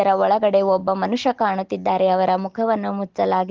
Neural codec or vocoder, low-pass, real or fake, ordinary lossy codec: none; 7.2 kHz; real; Opus, 16 kbps